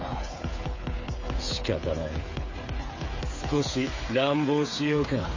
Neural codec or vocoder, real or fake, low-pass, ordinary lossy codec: codec, 16 kHz, 8 kbps, FreqCodec, smaller model; fake; 7.2 kHz; MP3, 32 kbps